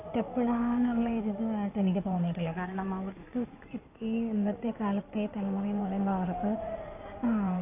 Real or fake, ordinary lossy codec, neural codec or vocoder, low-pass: fake; AAC, 24 kbps; codec, 16 kHz in and 24 kHz out, 2.2 kbps, FireRedTTS-2 codec; 3.6 kHz